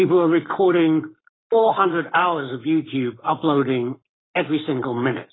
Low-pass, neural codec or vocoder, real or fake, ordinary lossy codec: 7.2 kHz; codec, 44.1 kHz, 7.8 kbps, Pupu-Codec; fake; AAC, 16 kbps